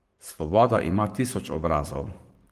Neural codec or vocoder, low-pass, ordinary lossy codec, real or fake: codec, 44.1 kHz, 7.8 kbps, Pupu-Codec; 14.4 kHz; Opus, 24 kbps; fake